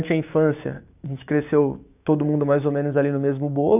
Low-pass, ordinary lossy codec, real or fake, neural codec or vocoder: 3.6 kHz; AAC, 32 kbps; fake; codec, 16 kHz, 6 kbps, DAC